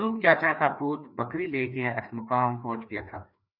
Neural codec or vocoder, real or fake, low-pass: codec, 16 kHz, 2 kbps, FreqCodec, larger model; fake; 5.4 kHz